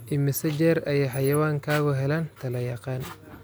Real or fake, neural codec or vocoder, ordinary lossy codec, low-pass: real; none; none; none